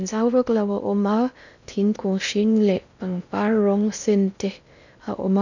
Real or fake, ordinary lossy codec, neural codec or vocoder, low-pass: fake; none; codec, 16 kHz in and 24 kHz out, 0.6 kbps, FocalCodec, streaming, 2048 codes; 7.2 kHz